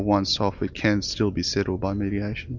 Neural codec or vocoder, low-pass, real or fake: none; 7.2 kHz; real